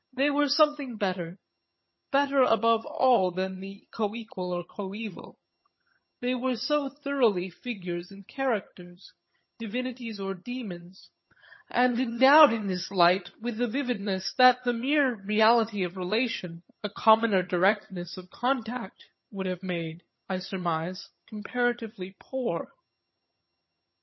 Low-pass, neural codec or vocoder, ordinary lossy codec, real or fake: 7.2 kHz; vocoder, 22.05 kHz, 80 mel bands, HiFi-GAN; MP3, 24 kbps; fake